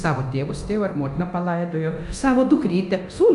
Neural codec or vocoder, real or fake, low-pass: codec, 24 kHz, 0.9 kbps, DualCodec; fake; 10.8 kHz